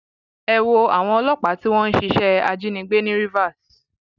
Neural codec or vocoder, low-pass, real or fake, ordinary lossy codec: none; 7.2 kHz; real; none